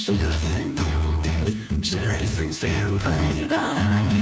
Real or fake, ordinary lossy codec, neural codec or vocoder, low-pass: fake; none; codec, 16 kHz, 1 kbps, FunCodec, trained on LibriTTS, 50 frames a second; none